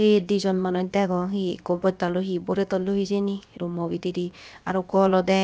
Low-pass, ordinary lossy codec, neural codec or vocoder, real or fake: none; none; codec, 16 kHz, about 1 kbps, DyCAST, with the encoder's durations; fake